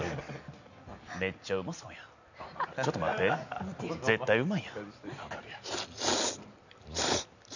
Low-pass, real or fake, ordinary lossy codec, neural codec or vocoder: 7.2 kHz; real; none; none